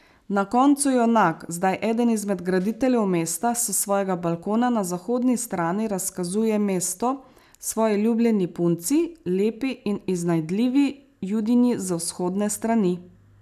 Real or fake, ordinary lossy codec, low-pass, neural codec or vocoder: real; none; 14.4 kHz; none